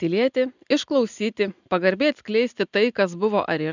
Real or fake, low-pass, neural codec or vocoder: real; 7.2 kHz; none